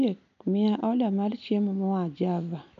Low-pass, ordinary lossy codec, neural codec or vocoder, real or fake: 7.2 kHz; none; none; real